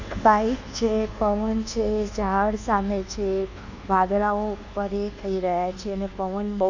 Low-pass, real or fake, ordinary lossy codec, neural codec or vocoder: 7.2 kHz; fake; Opus, 64 kbps; codec, 24 kHz, 1.2 kbps, DualCodec